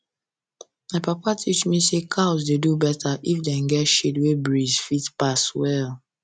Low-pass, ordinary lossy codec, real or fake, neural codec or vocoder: 9.9 kHz; none; real; none